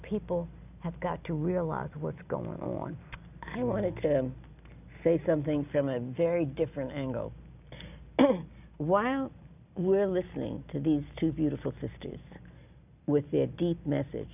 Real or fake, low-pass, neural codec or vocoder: real; 3.6 kHz; none